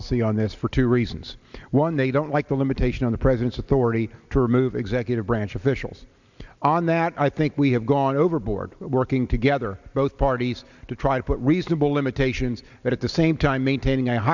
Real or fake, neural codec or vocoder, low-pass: real; none; 7.2 kHz